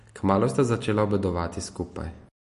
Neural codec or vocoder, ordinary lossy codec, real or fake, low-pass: none; MP3, 48 kbps; real; 14.4 kHz